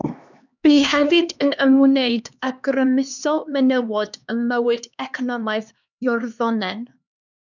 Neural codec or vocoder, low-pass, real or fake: codec, 16 kHz, 2 kbps, X-Codec, HuBERT features, trained on LibriSpeech; 7.2 kHz; fake